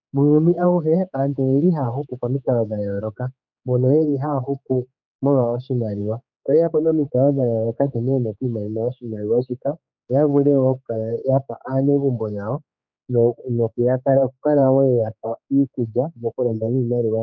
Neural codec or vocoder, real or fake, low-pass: codec, 16 kHz, 4 kbps, X-Codec, HuBERT features, trained on general audio; fake; 7.2 kHz